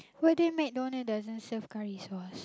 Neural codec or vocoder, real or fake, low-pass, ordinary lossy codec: none; real; none; none